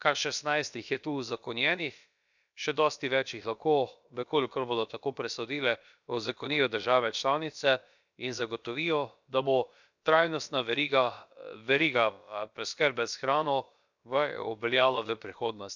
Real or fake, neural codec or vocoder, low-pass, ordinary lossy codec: fake; codec, 16 kHz, about 1 kbps, DyCAST, with the encoder's durations; 7.2 kHz; none